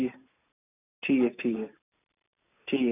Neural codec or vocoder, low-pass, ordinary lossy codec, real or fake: none; 3.6 kHz; none; real